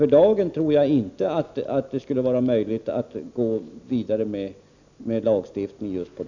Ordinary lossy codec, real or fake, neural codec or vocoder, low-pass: none; real; none; 7.2 kHz